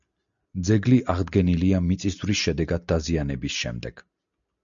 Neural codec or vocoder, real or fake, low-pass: none; real; 7.2 kHz